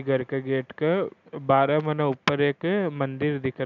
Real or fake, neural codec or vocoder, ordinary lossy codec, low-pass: real; none; none; 7.2 kHz